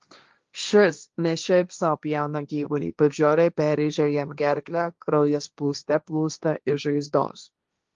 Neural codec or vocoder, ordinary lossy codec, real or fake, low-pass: codec, 16 kHz, 1.1 kbps, Voila-Tokenizer; Opus, 32 kbps; fake; 7.2 kHz